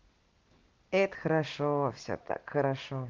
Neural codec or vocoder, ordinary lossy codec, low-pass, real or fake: none; Opus, 16 kbps; 7.2 kHz; real